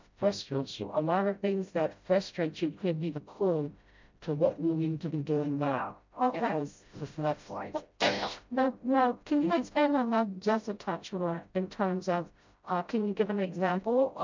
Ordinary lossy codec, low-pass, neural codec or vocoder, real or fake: MP3, 64 kbps; 7.2 kHz; codec, 16 kHz, 0.5 kbps, FreqCodec, smaller model; fake